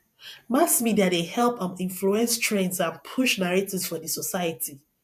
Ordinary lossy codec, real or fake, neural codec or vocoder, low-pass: none; fake; vocoder, 48 kHz, 128 mel bands, Vocos; 14.4 kHz